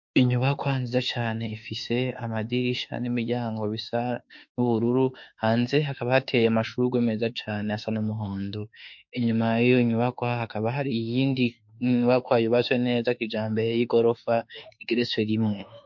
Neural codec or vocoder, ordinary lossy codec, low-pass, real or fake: autoencoder, 48 kHz, 32 numbers a frame, DAC-VAE, trained on Japanese speech; MP3, 48 kbps; 7.2 kHz; fake